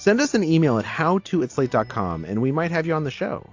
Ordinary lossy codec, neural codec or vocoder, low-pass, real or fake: AAC, 48 kbps; none; 7.2 kHz; real